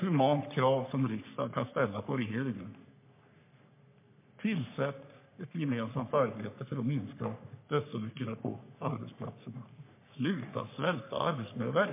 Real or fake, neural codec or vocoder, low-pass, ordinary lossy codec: fake; codec, 44.1 kHz, 3.4 kbps, Pupu-Codec; 3.6 kHz; MP3, 24 kbps